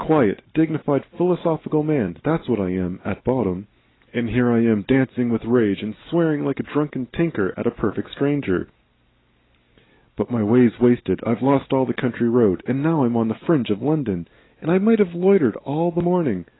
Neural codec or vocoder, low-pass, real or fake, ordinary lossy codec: none; 7.2 kHz; real; AAC, 16 kbps